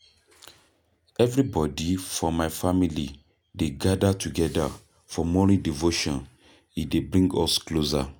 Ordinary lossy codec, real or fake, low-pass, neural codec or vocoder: none; real; none; none